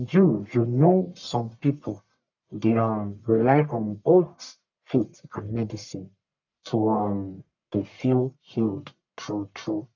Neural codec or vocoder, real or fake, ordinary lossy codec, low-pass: codec, 44.1 kHz, 1.7 kbps, Pupu-Codec; fake; none; 7.2 kHz